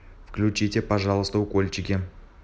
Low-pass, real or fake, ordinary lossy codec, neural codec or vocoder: none; real; none; none